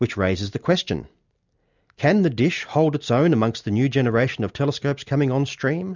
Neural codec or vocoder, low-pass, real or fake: none; 7.2 kHz; real